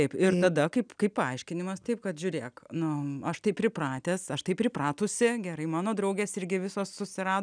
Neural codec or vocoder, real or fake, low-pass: none; real; 9.9 kHz